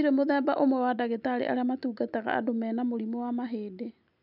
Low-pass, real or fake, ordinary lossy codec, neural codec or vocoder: 5.4 kHz; real; none; none